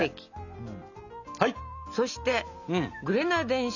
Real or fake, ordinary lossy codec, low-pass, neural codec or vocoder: real; none; 7.2 kHz; none